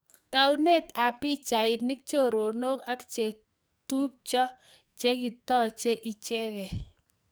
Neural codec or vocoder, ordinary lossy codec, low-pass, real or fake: codec, 44.1 kHz, 2.6 kbps, SNAC; none; none; fake